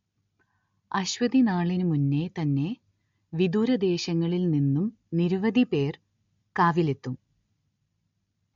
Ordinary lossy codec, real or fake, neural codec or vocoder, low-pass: MP3, 48 kbps; real; none; 7.2 kHz